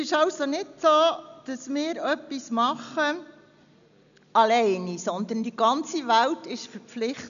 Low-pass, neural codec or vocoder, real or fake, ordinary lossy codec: 7.2 kHz; none; real; none